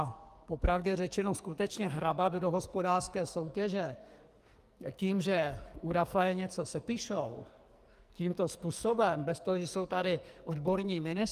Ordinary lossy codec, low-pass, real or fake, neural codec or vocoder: Opus, 32 kbps; 14.4 kHz; fake; codec, 44.1 kHz, 2.6 kbps, SNAC